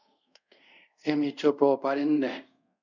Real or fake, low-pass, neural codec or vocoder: fake; 7.2 kHz; codec, 24 kHz, 0.5 kbps, DualCodec